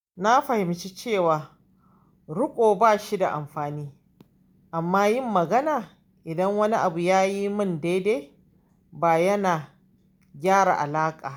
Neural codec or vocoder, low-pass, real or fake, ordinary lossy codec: none; none; real; none